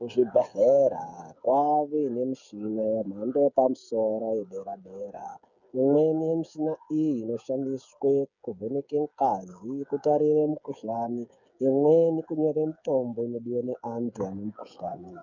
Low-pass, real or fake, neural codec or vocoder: 7.2 kHz; fake; codec, 16 kHz, 8 kbps, FreqCodec, smaller model